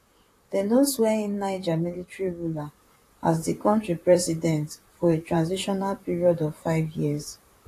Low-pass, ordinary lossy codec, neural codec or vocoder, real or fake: 14.4 kHz; AAC, 48 kbps; vocoder, 44.1 kHz, 128 mel bands, Pupu-Vocoder; fake